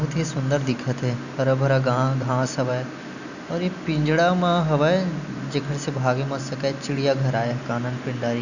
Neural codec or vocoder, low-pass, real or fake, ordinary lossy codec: none; 7.2 kHz; real; none